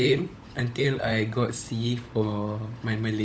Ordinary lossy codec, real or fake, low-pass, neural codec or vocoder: none; fake; none; codec, 16 kHz, 16 kbps, FunCodec, trained on LibriTTS, 50 frames a second